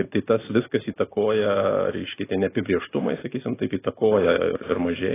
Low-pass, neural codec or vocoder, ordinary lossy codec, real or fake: 3.6 kHz; none; AAC, 16 kbps; real